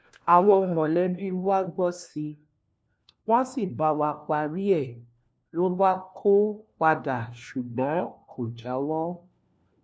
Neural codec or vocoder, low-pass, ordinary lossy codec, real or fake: codec, 16 kHz, 1 kbps, FunCodec, trained on LibriTTS, 50 frames a second; none; none; fake